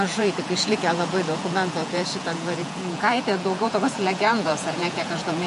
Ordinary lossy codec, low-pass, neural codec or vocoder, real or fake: MP3, 48 kbps; 14.4 kHz; vocoder, 48 kHz, 128 mel bands, Vocos; fake